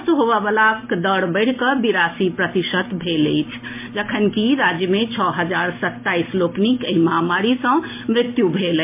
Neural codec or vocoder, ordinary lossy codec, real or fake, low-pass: none; MP3, 24 kbps; real; 3.6 kHz